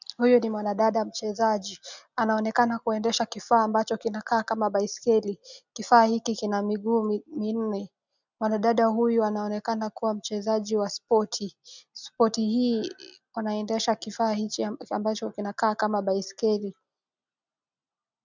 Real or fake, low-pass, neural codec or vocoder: real; 7.2 kHz; none